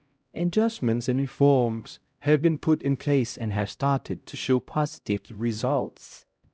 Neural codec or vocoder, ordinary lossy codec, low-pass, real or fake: codec, 16 kHz, 0.5 kbps, X-Codec, HuBERT features, trained on LibriSpeech; none; none; fake